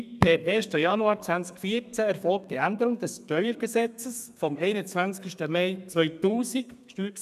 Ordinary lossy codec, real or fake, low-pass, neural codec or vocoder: none; fake; 14.4 kHz; codec, 32 kHz, 1.9 kbps, SNAC